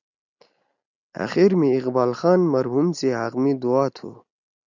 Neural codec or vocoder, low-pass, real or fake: none; 7.2 kHz; real